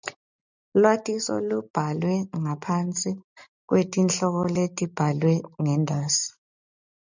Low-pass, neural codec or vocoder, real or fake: 7.2 kHz; none; real